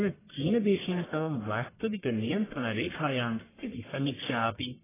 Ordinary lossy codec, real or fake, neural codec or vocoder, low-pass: AAC, 16 kbps; fake; codec, 44.1 kHz, 1.7 kbps, Pupu-Codec; 3.6 kHz